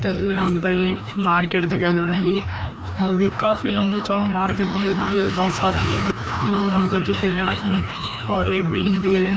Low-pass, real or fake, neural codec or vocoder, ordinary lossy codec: none; fake; codec, 16 kHz, 1 kbps, FreqCodec, larger model; none